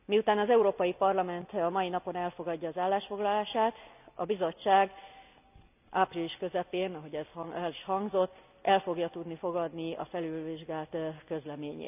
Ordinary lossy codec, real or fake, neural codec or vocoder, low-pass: none; real; none; 3.6 kHz